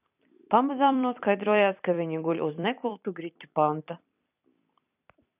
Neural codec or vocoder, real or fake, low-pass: vocoder, 44.1 kHz, 128 mel bands every 256 samples, BigVGAN v2; fake; 3.6 kHz